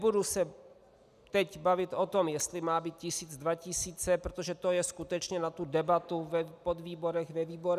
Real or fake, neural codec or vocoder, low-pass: real; none; 14.4 kHz